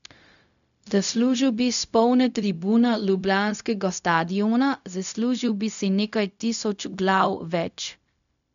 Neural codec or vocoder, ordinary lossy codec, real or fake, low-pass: codec, 16 kHz, 0.4 kbps, LongCat-Audio-Codec; none; fake; 7.2 kHz